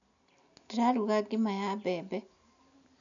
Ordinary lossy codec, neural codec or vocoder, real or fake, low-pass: none; none; real; 7.2 kHz